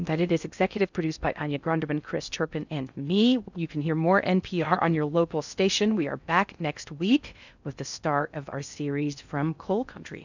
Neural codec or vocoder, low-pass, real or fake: codec, 16 kHz in and 24 kHz out, 0.6 kbps, FocalCodec, streaming, 4096 codes; 7.2 kHz; fake